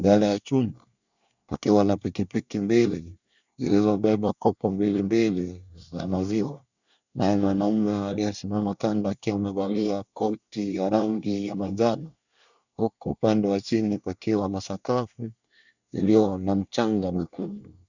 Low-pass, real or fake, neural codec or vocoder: 7.2 kHz; fake; codec, 24 kHz, 1 kbps, SNAC